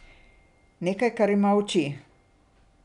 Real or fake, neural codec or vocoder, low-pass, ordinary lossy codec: real; none; 10.8 kHz; none